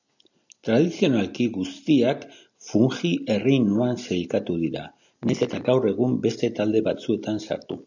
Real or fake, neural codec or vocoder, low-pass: real; none; 7.2 kHz